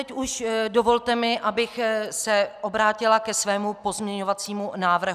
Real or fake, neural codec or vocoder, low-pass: real; none; 14.4 kHz